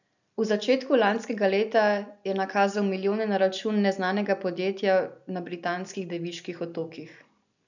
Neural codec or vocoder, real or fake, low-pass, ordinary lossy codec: vocoder, 44.1 kHz, 128 mel bands every 256 samples, BigVGAN v2; fake; 7.2 kHz; none